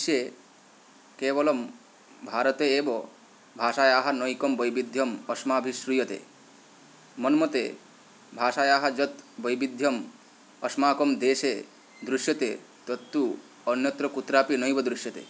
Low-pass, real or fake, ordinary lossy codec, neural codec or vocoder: none; real; none; none